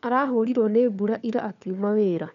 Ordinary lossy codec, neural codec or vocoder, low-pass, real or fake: none; codec, 16 kHz, 4 kbps, FunCodec, trained on LibriTTS, 50 frames a second; 7.2 kHz; fake